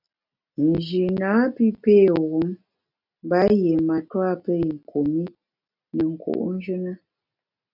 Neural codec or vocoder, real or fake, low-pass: none; real; 5.4 kHz